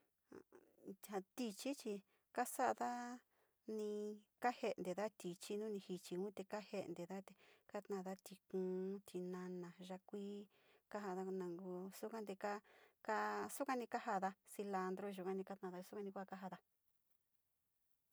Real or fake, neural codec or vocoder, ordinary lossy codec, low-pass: real; none; none; none